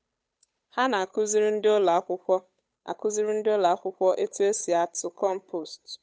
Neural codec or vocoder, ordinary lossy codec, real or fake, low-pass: codec, 16 kHz, 8 kbps, FunCodec, trained on Chinese and English, 25 frames a second; none; fake; none